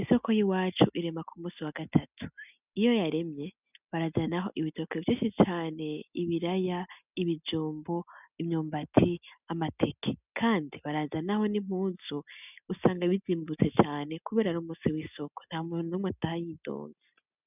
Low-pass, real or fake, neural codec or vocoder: 3.6 kHz; real; none